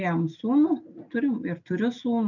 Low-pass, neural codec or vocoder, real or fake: 7.2 kHz; none; real